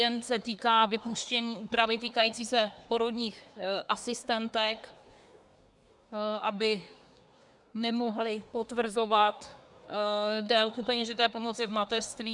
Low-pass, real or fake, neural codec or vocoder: 10.8 kHz; fake; codec, 24 kHz, 1 kbps, SNAC